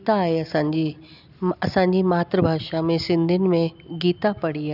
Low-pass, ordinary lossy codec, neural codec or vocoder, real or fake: 5.4 kHz; none; none; real